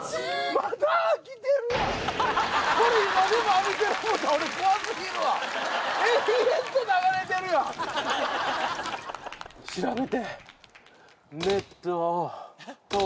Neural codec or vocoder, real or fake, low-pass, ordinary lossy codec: none; real; none; none